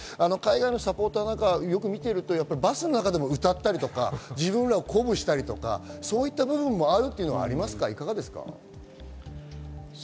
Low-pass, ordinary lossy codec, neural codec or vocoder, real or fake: none; none; none; real